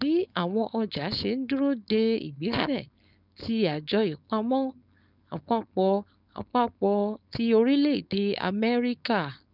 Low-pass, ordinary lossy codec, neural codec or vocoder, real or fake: 5.4 kHz; none; codec, 16 kHz, 4.8 kbps, FACodec; fake